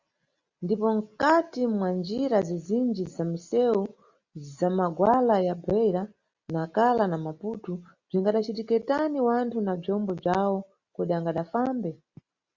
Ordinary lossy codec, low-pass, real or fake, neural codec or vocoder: Opus, 64 kbps; 7.2 kHz; real; none